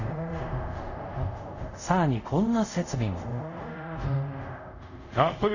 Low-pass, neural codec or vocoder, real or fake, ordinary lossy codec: 7.2 kHz; codec, 24 kHz, 0.5 kbps, DualCodec; fake; none